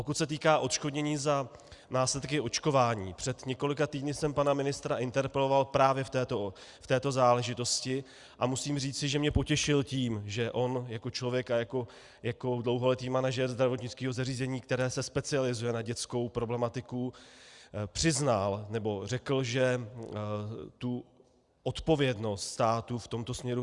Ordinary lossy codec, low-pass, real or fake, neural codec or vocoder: Opus, 64 kbps; 10.8 kHz; real; none